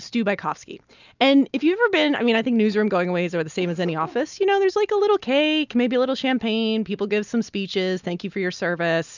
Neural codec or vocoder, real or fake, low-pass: none; real; 7.2 kHz